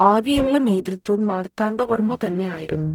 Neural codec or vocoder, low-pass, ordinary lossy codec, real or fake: codec, 44.1 kHz, 0.9 kbps, DAC; 19.8 kHz; none; fake